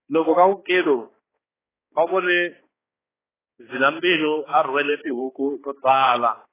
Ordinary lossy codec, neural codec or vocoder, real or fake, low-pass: AAC, 16 kbps; codec, 16 kHz, 2 kbps, X-Codec, HuBERT features, trained on balanced general audio; fake; 3.6 kHz